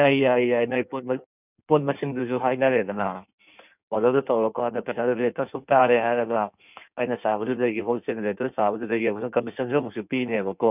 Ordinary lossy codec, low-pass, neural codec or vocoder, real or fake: none; 3.6 kHz; codec, 16 kHz in and 24 kHz out, 1.1 kbps, FireRedTTS-2 codec; fake